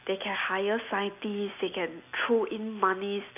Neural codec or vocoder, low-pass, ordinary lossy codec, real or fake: none; 3.6 kHz; none; real